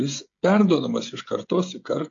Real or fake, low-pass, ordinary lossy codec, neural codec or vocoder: fake; 7.2 kHz; AAC, 48 kbps; codec, 16 kHz, 16 kbps, FreqCodec, smaller model